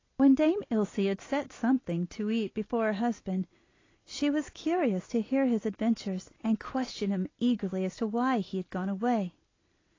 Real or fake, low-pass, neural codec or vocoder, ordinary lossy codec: real; 7.2 kHz; none; AAC, 32 kbps